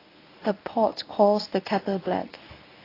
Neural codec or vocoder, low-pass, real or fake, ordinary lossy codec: codec, 24 kHz, 0.9 kbps, WavTokenizer, medium speech release version 1; 5.4 kHz; fake; AAC, 24 kbps